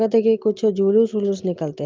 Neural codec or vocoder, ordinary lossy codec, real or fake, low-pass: none; Opus, 24 kbps; real; 7.2 kHz